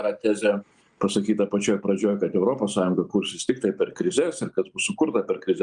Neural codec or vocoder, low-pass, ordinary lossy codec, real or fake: none; 9.9 kHz; Opus, 32 kbps; real